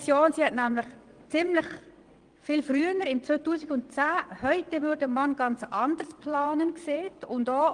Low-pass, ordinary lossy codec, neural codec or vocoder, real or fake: 9.9 kHz; Opus, 24 kbps; vocoder, 22.05 kHz, 80 mel bands, WaveNeXt; fake